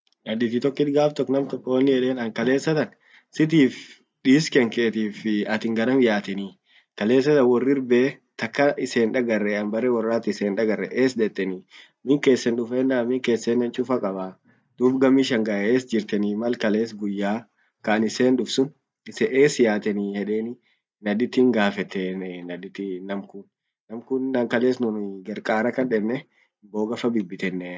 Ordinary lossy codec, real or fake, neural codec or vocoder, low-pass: none; real; none; none